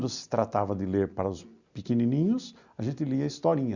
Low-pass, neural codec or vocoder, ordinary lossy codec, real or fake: 7.2 kHz; none; Opus, 64 kbps; real